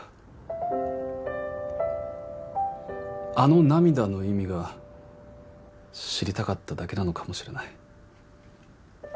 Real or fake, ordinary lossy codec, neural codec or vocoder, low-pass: real; none; none; none